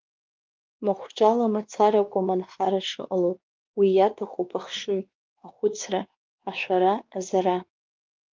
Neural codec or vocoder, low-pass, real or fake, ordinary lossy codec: codec, 16 kHz, 2 kbps, X-Codec, WavLM features, trained on Multilingual LibriSpeech; 7.2 kHz; fake; Opus, 24 kbps